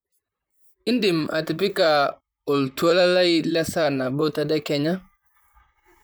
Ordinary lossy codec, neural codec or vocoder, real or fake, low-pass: none; vocoder, 44.1 kHz, 128 mel bands, Pupu-Vocoder; fake; none